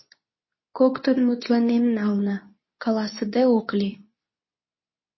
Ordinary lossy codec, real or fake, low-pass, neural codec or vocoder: MP3, 24 kbps; fake; 7.2 kHz; codec, 24 kHz, 0.9 kbps, WavTokenizer, medium speech release version 2